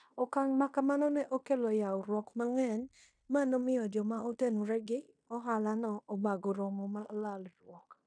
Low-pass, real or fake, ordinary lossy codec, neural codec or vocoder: 9.9 kHz; fake; none; codec, 16 kHz in and 24 kHz out, 0.9 kbps, LongCat-Audio-Codec, fine tuned four codebook decoder